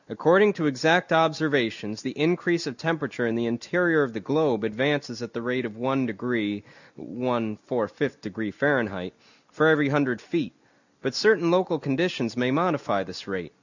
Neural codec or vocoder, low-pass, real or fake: none; 7.2 kHz; real